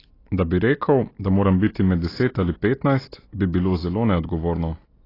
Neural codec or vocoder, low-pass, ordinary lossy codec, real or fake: none; 5.4 kHz; AAC, 24 kbps; real